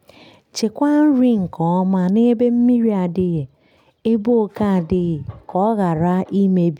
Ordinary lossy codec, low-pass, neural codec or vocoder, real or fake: none; 19.8 kHz; none; real